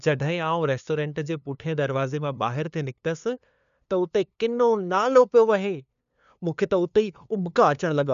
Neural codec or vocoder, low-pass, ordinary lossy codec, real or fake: codec, 16 kHz, 2 kbps, FunCodec, trained on LibriTTS, 25 frames a second; 7.2 kHz; none; fake